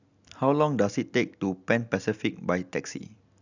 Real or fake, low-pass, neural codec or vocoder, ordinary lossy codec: real; 7.2 kHz; none; none